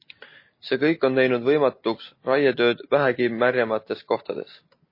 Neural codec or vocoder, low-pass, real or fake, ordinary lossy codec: none; 5.4 kHz; real; MP3, 24 kbps